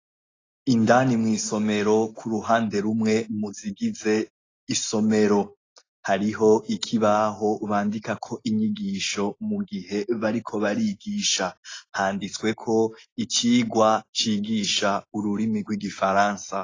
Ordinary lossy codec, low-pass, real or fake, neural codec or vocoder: AAC, 32 kbps; 7.2 kHz; real; none